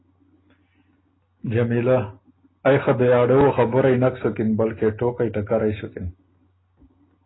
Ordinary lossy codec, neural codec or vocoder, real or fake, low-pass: AAC, 16 kbps; none; real; 7.2 kHz